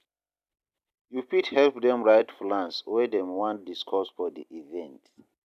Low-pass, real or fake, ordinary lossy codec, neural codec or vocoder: 14.4 kHz; fake; none; vocoder, 44.1 kHz, 128 mel bands every 256 samples, BigVGAN v2